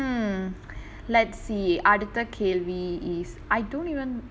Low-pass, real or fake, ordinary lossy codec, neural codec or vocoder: none; real; none; none